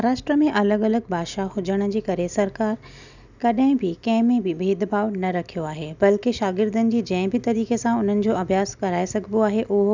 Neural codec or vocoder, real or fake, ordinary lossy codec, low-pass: none; real; none; 7.2 kHz